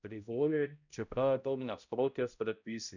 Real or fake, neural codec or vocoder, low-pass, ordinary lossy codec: fake; codec, 16 kHz, 0.5 kbps, X-Codec, HuBERT features, trained on balanced general audio; 7.2 kHz; none